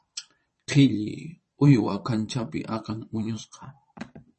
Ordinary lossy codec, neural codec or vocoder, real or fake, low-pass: MP3, 32 kbps; vocoder, 22.05 kHz, 80 mel bands, Vocos; fake; 9.9 kHz